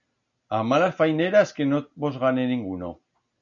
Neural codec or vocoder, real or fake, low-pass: none; real; 7.2 kHz